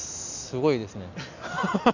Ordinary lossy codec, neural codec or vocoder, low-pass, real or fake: none; none; 7.2 kHz; real